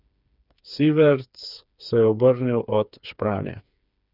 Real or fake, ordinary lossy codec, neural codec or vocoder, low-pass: fake; none; codec, 16 kHz, 4 kbps, FreqCodec, smaller model; 5.4 kHz